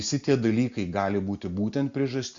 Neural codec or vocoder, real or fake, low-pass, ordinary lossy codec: none; real; 7.2 kHz; Opus, 64 kbps